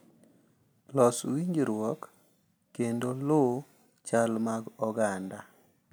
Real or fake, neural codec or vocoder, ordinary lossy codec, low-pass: real; none; none; none